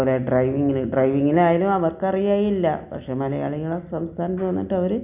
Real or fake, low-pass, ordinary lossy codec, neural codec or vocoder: real; 3.6 kHz; none; none